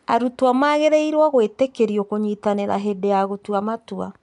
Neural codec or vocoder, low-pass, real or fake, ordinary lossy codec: none; 10.8 kHz; real; none